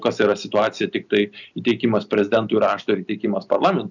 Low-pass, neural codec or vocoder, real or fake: 7.2 kHz; none; real